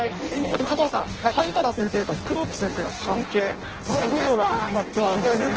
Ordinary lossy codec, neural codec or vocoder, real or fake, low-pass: Opus, 16 kbps; codec, 16 kHz in and 24 kHz out, 0.6 kbps, FireRedTTS-2 codec; fake; 7.2 kHz